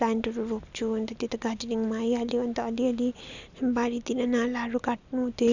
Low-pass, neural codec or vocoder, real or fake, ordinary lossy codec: 7.2 kHz; none; real; none